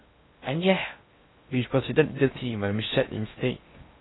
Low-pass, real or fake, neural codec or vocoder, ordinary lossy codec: 7.2 kHz; fake; codec, 16 kHz in and 24 kHz out, 0.6 kbps, FocalCodec, streaming, 4096 codes; AAC, 16 kbps